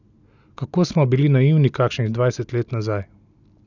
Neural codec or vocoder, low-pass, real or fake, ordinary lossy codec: none; 7.2 kHz; real; none